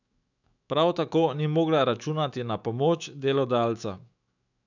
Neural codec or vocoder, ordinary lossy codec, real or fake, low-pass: autoencoder, 48 kHz, 128 numbers a frame, DAC-VAE, trained on Japanese speech; none; fake; 7.2 kHz